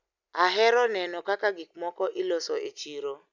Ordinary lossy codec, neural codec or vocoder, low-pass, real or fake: none; none; 7.2 kHz; real